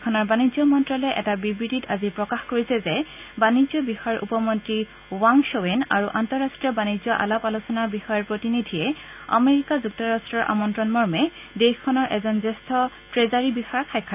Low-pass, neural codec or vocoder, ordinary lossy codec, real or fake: 3.6 kHz; none; none; real